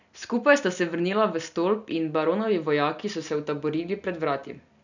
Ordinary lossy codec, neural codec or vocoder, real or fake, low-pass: none; none; real; 7.2 kHz